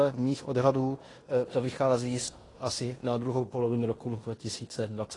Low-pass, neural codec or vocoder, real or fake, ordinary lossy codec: 10.8 kHz; codec, 16 kHz in and 24 kHz out, 0.9 kbps, LongCat-Audio-Codec, fine tuned four codebook decoder; fake; AAC, 32 kbps